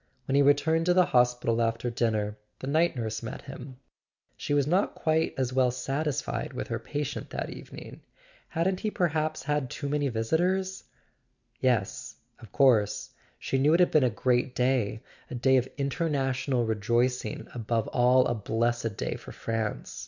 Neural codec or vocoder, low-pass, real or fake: none; 7.2 kHz; real